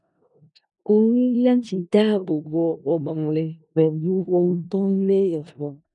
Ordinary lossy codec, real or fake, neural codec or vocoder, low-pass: none; fake; codec, 16 kHz in and 24 kHz out, 0.4 kbps, LongCat-Audio-Codec, four codebook decoder; 10.8 kHz